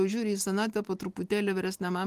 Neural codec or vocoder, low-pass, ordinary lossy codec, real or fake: none; 14.4 kHz; Opus, 24 kbps; real